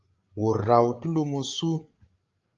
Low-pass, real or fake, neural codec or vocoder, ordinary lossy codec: 7.2 kHz; fake; codec, 16 kHz, 8 kbps, FreqCodec, larger model; Opus, 32 kbps